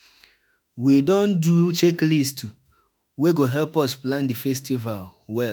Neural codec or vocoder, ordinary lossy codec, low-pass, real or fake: autoencoder, 48 kHz, 32 numbers a frame, DAC-VAE, trained on Japanese speech; none; none; fake